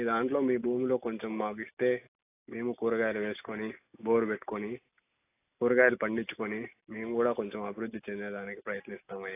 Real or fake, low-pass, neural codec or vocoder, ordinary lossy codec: real; 3.6 kHz; none; AAC, 24 kbps